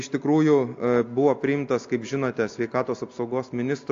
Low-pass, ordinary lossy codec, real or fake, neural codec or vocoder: 7.2 kHz; AAC, 48 kbps; real; none